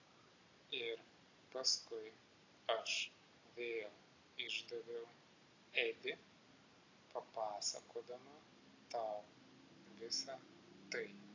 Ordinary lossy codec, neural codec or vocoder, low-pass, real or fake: AAC, 32 kbps; none; 7.2 kHz; real